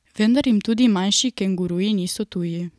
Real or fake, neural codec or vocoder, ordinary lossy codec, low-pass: real; none; none; none